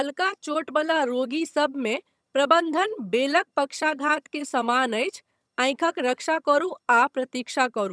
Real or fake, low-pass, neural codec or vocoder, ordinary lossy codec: fake; none; vocoder, 22.05 kHz, 80 mel bands, HiFi-GAN; none